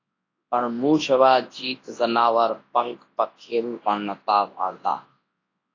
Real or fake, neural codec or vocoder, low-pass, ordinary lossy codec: fake; codec, 24 kHz, 0.9 kbps, WavTokenizer, large speech release; 7.2 kHz; AAC, 32 kbps